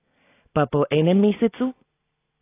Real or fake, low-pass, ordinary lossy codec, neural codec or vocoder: real; 3.6 kHz; AAC, 16 kbps; none